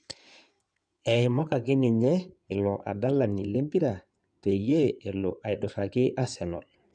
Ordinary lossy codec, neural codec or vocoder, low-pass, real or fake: none; codec, 16 kHz in and 24 kHz out, 2.2 kbps, FireRedTTS-2 codec; 9.9 kHz; fake